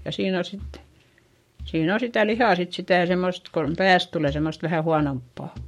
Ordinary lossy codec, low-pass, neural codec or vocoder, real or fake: MP3, 64 kbps; 19.8 kHz; codec, 44.1 kHz, 7.8 kbps, Pupu-Codec; fake